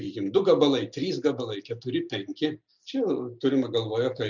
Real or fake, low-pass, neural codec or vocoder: real; 7.2 kHz; none